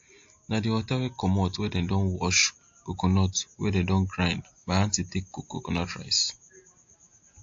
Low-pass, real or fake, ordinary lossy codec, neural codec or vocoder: 7.2 kHz; real; AAC, 48 kbps; none